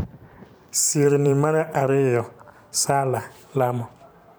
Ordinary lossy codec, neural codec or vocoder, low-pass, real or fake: none; vocoder, 44.1 kHz, 128 mel bands every 512 samples, BigVGAN v2; none; fake